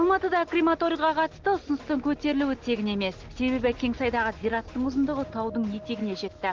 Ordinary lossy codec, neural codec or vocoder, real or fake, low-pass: Opus, 16 kbps; none; real; 7.2 kHz